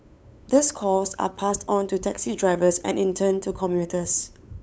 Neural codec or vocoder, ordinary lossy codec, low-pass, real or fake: codec, 16 kHz, 8 kbps, FunCodec, trained on LibriTTS, 25 frames a second; none; none; fake